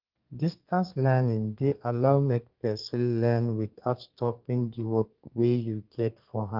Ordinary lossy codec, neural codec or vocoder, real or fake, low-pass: Opus, 32 kbps; codec, 32 kHz, 1.9 kbps, SNAC; fake; 5.4 kHz